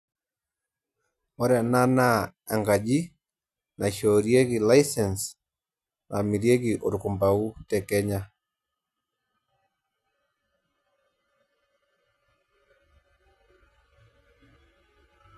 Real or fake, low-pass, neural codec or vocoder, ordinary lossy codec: real; 14.4 kHz; none; none